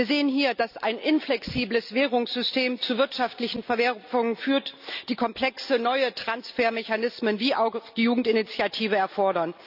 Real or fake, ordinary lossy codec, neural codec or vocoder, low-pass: real; none; none; 5.4 kHz